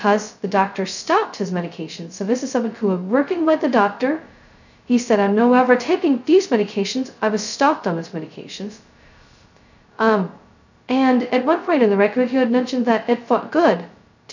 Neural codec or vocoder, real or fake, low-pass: codec, 16 kHz, 0.2 kbps, FocalCodec; fake; 7.2 kHz